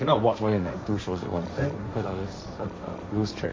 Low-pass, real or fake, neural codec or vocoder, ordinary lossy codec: none; fake; codec, 16 kHz, 1.1 kbps, Voila-Tokenizer; none